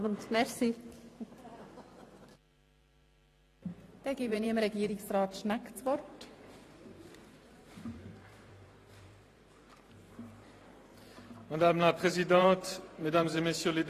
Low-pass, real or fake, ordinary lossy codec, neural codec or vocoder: 14.4 kHz; fake; AAC, 48 kbps; vocoder, 44.1 kHz, 128 mel bands every 512 samples, BigVGAN v2